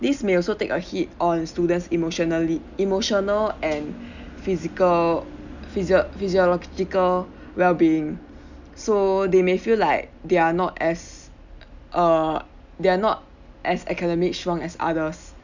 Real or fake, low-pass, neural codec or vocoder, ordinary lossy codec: real; 7.2 kHz; none; none